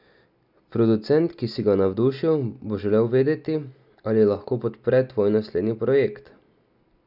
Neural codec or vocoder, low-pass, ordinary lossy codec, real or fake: none; 5.4 kHz; none; real